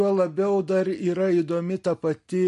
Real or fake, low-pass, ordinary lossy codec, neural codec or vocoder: real; 14.4 kHz; MP3, 48 kbps; none